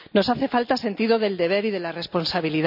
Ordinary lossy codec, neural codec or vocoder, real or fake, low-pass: none; none; real; 5.4 kHz